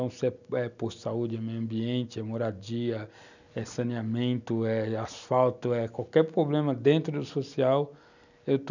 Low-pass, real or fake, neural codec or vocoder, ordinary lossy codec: 7.2 kHz; real; none; none